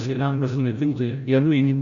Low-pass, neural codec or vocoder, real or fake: 7.2 kHz; codec, 16 kHz, 0.5 kbps, FreqCodec, larger model; fake